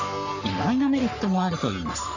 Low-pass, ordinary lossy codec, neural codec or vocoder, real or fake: 7.2 kHz; none; codec, 44.1 kHz, 3.4 kbps, Pupu-Codec; fake